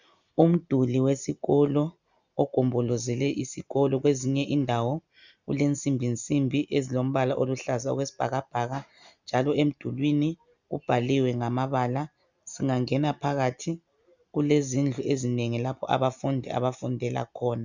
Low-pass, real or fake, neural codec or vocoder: 7.2 kHz; real; none